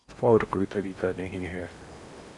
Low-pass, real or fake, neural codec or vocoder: 10.8 kHz; fake; codec, 16 kHz in and 24 kHz out, 0.8 kbps, FocalCodec, streaming, 65536 codes